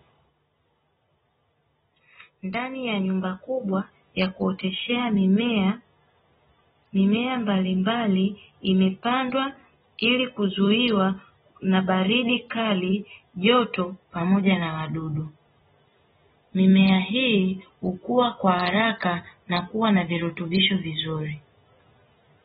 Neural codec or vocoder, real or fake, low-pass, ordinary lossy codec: none; real; 7.2 kHz; AAC, 16 kbps